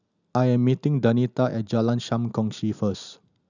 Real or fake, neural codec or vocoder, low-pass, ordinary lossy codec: real; none; 7.2 kHz; none